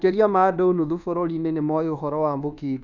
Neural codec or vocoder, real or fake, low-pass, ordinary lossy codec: codec, 24 kHz, 1.2 kbps, DualCodec; fake; 7.2 kHz; none